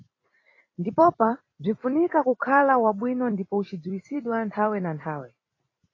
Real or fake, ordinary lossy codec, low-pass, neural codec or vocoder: fake; AAC, 32 kbps; 7.2 kHz; vocoder, 44.1 kHz, 128 mel bands every 256 samples, BigVGAN v2